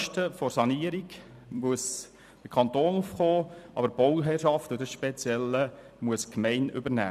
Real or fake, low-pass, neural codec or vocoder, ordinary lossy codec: fake; 14.4 kHz; vocoder, 44.1 kHz, 128 mel bands every 256 samples, BigVGAN v2; none